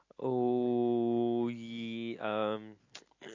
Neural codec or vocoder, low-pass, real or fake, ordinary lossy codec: none; 7.2 kHz; real; MP3, 48 kbps